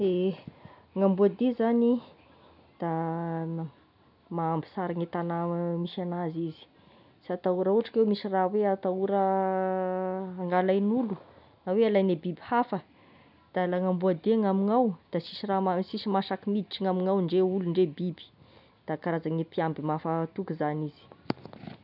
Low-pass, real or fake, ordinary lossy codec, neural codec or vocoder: 5.4 kHz; real; none; none